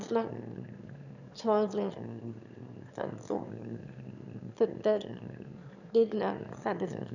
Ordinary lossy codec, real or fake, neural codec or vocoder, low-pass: none; fake; autoencoder, 22.05 kHz, a latent of 192 numbers a frame, VITS, trained on one speaker; 7.2 kHz